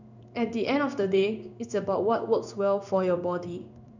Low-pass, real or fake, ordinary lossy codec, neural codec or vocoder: 7.2 kHz; fake; none; codec, 16 kHz in and 24 kHz out, 1 kbps, XY-Tokenizer